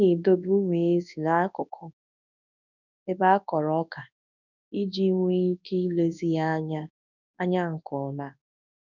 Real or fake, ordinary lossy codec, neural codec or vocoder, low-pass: fake; none; codec, 24 kHz, 0.9 kbps, WavTokenizer, large speech release; 7.2 kHz